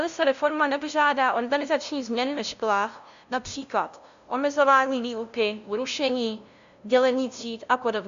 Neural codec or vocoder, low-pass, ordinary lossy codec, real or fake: codec, 16 kHz, 0.5 kbps, FunCodec, trained on LibriTTS, 25 frames a second; 7.2 kHz; Opus, 64 kbps; fake